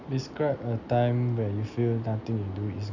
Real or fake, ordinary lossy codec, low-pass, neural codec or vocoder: real; none; 7.2 kHz; none